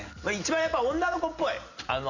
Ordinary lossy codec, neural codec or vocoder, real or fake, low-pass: none; none; real; 7.2 kHz